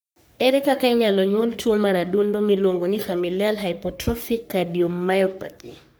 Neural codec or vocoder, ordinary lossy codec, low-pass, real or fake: codec, 44.1 kHz, 3.4 kbps, Pupu-Codec; none; none; fake